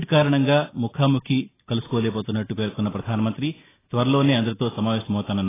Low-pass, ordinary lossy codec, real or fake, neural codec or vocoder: 3.6 kHz; AAC, 16 kbps; real; none